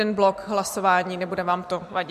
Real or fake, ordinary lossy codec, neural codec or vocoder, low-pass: real; MP3, 64 kbps; none; 14.4 kHz